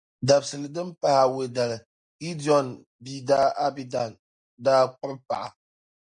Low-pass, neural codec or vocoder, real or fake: 9.9 kHz; none; real